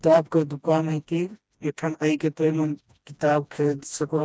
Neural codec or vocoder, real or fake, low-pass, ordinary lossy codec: codec, 16 kHz, 1 kbps, FreqCodec, smaller model; fake; none; none